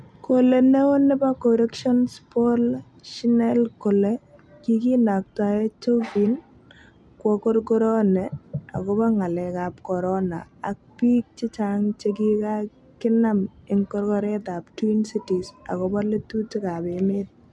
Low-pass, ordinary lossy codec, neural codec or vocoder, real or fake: none; none; none; real